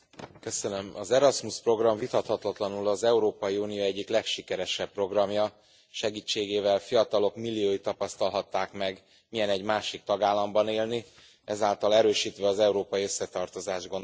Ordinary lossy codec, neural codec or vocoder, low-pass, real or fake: none; none; none; real